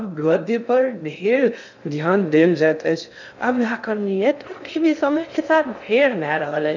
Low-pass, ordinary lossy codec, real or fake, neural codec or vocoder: 7.2 kHz; none; fake; codec, 16 kHz in and 24 kHz out, 0.6 kbps, FocalCodec, streaming, 2048 codes